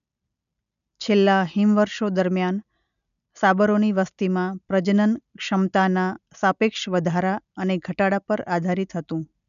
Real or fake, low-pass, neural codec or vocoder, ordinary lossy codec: real; 7.2 kHz; none; none